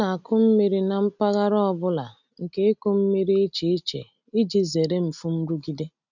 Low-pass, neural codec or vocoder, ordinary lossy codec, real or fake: 7.2 kHz; none; none; real